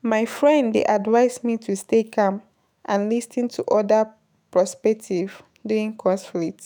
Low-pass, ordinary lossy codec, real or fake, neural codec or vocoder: none; none; fake; autoencoder, 48 kHz, 128 numbers a frame, DAC-VAE, trained on Japanese speech